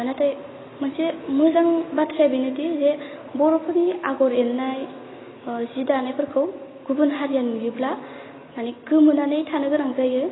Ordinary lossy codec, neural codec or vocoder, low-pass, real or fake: AAC, 16 kbps; none; 7.2 kHz; real